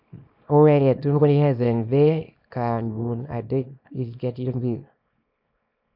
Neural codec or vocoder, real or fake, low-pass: codec, 24 kHz, 0.9 kbps, WavTokenizer, small release; fake; 5.4 kHz